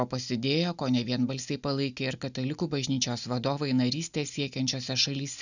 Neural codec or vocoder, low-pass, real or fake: none; 7.2 kHz; real